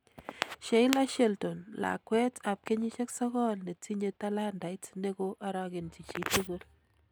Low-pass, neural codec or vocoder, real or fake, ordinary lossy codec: none; none; real; none